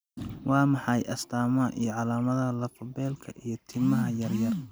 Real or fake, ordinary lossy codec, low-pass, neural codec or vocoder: real; none; none; none